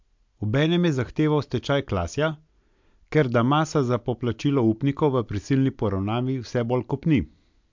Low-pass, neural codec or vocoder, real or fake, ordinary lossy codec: 7.2 kHz; none; real; MP3, 64 kbps